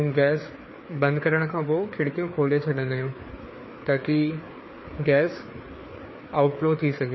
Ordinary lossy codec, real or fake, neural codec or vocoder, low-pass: MP3, 24 kbps; fake; codec, 16 kHz, 4 kbps, FreqCodec, larger model; 7.2 kHz